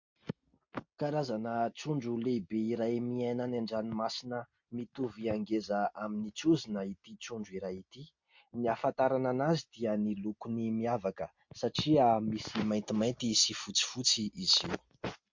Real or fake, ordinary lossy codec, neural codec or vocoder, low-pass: real; MP3, 48 kbps; none; 7.2 kHz